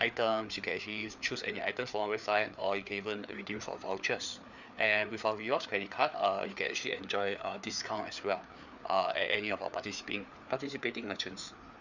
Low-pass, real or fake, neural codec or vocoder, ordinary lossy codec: 7.2 kHz; fake; codec, 16 kHz, 4 kbps, FreqCodec, larger model; none